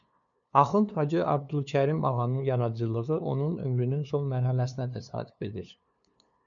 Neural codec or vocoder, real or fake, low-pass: codec, 16 kHz, 2 kbps, FunCodec, trained on LibriTTS, 25 frames a second; fake; 7.2 kHz